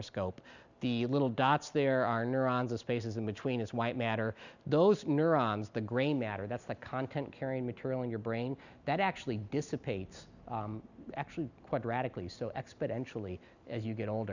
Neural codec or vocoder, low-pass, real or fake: none; 7.2 kHz; real